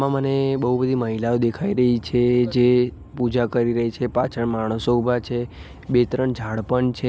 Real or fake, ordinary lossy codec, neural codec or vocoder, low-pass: real; none; none; none